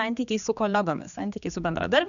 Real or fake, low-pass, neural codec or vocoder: fake; 7.2 kHz; codec, 16 kHz, 2 kbps, X-Codec, HuBERT features, trained on general audio